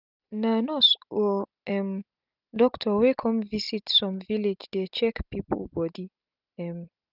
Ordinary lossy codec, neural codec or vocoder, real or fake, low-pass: none; none; real; 5.4 kHz